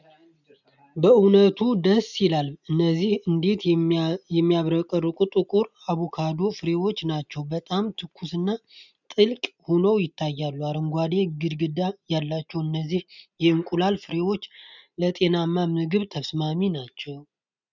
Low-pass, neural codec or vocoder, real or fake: 7.2 kHz; none; real